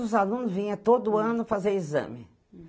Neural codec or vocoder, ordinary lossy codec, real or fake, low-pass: none; none; real; none